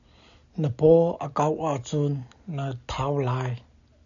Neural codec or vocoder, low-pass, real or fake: none; 7.2 kHz; real